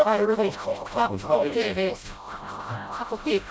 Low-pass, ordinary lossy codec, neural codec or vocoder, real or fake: none; none; codec, 16 kHz, 0.5 kbps, FreqCodec, smaller model; fake